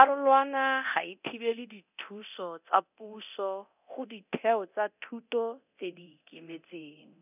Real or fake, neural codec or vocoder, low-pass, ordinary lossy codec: fake; codec, 24 kHz, 0.9 kbps, DualCodec; 3.6 kHz; none